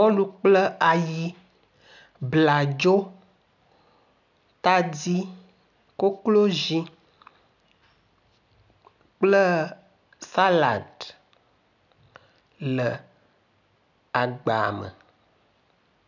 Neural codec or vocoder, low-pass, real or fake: none; 7.2 kHz; real